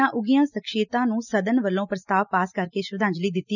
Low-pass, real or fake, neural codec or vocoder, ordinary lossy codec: 7.2 kHz; real; none; none